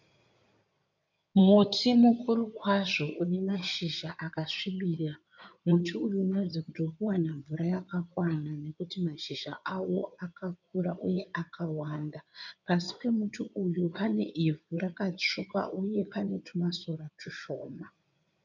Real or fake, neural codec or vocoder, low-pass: fake; codec, 16 kHz in and 24 kHz out, 2.2 kbps, FireRedTTS-2 codec; 7.2 kHz